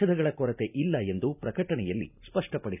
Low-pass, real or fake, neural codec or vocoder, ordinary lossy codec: 3.6 kHz; real; none; none